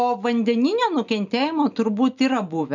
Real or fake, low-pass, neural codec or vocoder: real; 7.2 kHz; none